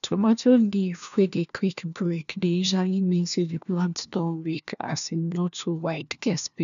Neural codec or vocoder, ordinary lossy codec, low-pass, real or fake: codec, 16 kHz, 1 kbps, FunCodec, trained on LibriTTS, 50 frames a second; none; 7.2 kHz; fake